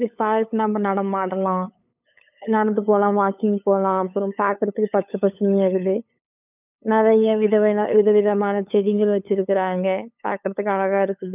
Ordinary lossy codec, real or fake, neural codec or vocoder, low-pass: none; fake; codec, 16 kHz, 8 kbps, FunCodec, trained on LibriTTS, 25 frames a second; 3.6 kHz